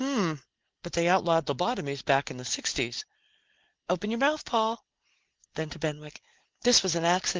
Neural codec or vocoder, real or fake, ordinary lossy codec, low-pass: none; real; Opus, 16 kbps; 7.2 kHz